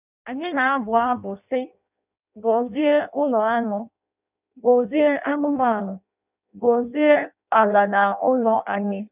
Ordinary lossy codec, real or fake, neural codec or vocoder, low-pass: none; fake; codec, 16 kHz in and 24 kHz out, 0.6 kbps, FireRedTTS-2 codec; 3.6 kHz